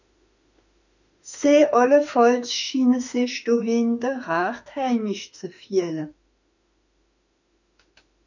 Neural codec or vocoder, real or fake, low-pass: autoencoder, 48 kHz, 32 numbers a frame, DAC-VAE, trained on Japanese speech; fake; 7.2 kHz